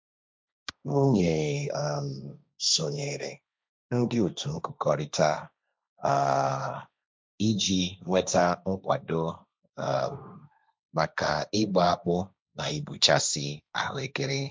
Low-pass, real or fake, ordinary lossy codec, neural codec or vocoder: none; fake; none; codec, 16 kHz, 1.1 kbps, Voila-Tokenizer